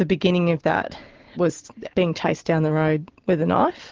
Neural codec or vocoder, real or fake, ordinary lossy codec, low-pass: none; real; Opus, 16 kbps; 7.2 kHz